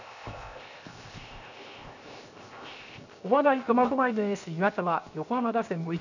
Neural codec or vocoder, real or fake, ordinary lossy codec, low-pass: codec, 16 kHz, 0.7 kbps, FocalCodec; fake; none; 7.2 kHz